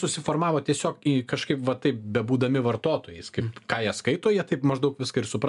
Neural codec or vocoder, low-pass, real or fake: none; 10.8 kHz; real